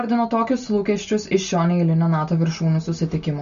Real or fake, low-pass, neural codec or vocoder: real; 7.2 kHz; none